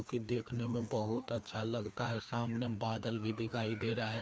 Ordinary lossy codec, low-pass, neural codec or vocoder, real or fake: none; none; codec, 16 kHz, 2 kbps, FreqCodec, larger model; fake